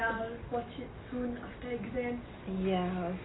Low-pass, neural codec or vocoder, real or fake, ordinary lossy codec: 7.2 kHz; none; real; AAC, 16 kbps